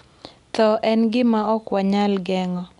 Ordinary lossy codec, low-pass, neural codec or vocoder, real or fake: none; 10.8 kHz; none; real